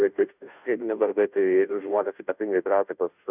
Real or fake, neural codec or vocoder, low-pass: fake; codec, 16 kHz, 0.5 kbps, FunCodec, trained on Chinese and English, 25 frames a second; 3.6 kHz